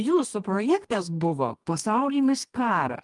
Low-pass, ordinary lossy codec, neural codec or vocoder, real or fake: 10.8 kHz; Opus, 32 kbps; codec, 24 kHz, 0.9 kbps, WavTokenizer, medium music audio release; fake